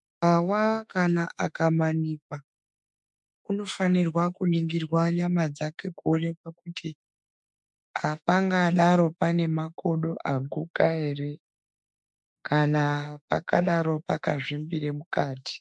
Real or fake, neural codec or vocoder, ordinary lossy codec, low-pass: fake; autoencoder, 48 kHz, 32 numbers a frame, DAC-VAE, trained on Japanese speech; MP3, 64 kbps; 10.8 kHz